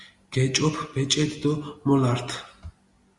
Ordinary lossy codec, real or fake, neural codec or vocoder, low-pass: Opus, 64 kbps; real; none; 10.8 kHz